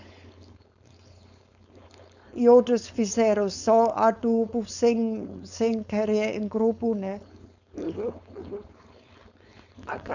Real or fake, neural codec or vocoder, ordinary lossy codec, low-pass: fake; codec, 16 kHz, 4.8 kbps, FACodec; none; 7.2 kHz